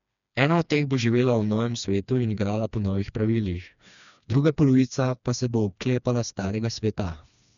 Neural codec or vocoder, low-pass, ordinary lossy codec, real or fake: codec, 16 kHz, 2 kbps, FreqCodec, smaller model; 7.2 kHz; none; fake